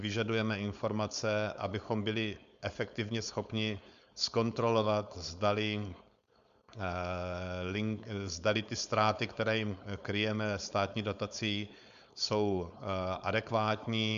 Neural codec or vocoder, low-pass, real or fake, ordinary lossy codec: codec, 16 kHz, 4.8 kbps, FACodec; 7.2 kHz; fake; Opus, 64 kbps